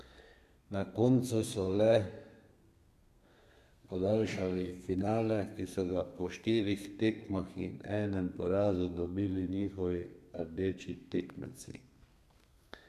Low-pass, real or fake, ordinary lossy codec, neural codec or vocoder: 14.4 kHz; fake; none; codec, 32 kHz, 1.9 kbps, SNAC